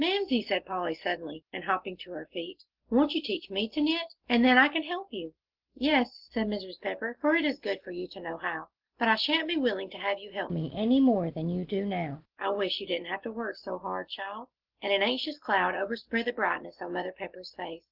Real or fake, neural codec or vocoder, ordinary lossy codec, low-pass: real; none; Opus, 16 kbps; 5.4 kHz